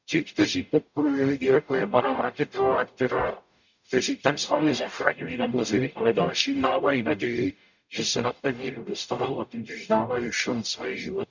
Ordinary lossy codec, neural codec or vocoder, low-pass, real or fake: none; codec, 44.1 kHz, 0.9 kbps, DAC; 7.2 kHz; fake